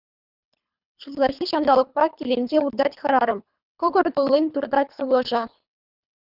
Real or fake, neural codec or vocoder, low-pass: fake; codec, 24 kHz, 3 kbps, HILCodec; 5.4 kHz